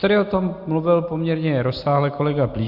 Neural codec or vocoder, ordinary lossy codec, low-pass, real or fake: none; Opus, 64 kbps; 5.4 kHz; real